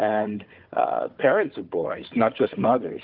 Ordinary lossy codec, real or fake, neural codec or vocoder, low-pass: Opus, 24 kbps; fake; codec, 16 kHz, 4 kbps, FunCodec, trained on Chinese and English, 50 frames a second; 5.4 kHz